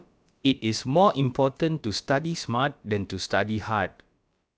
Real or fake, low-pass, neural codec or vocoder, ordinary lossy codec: fake; none; codec, 16 kHz, about 1 kbps, DyCAST, with the encoder's durations; none